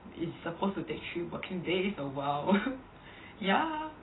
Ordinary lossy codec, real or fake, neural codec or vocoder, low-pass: AAC, 16 kbps; real; none; 7.2 kHz